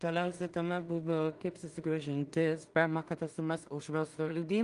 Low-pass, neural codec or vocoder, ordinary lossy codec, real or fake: 10.8 kHz; codec, 16 kHz in and 24 kHz out, 0.4 kbps, LongCat-Audio-Codec, two codebook decoder; Opus, 32 kbps; fake